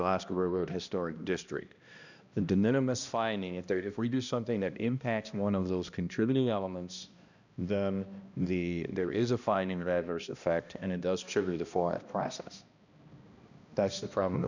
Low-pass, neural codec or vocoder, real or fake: 7.2 kHz; codec, 16 kHz, 1 kbps, X-Codec, HuBERT features, trained on balanced general audio; fake